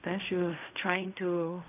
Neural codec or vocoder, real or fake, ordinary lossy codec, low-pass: codec, 16 kHz in and 24 kHz out, 0.4 kbps, LongCat-Audio-Codec, fine tuned four codebook decoder; fake; none; 3.6 kHz